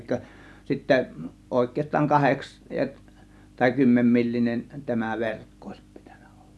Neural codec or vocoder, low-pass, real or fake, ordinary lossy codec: none; none; real; none